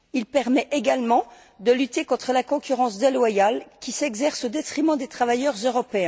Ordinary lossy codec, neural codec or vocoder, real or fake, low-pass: none; none; real; none